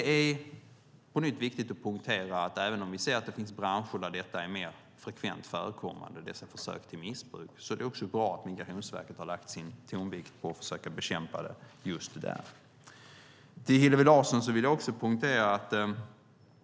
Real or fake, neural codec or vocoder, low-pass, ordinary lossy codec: real; none; none; none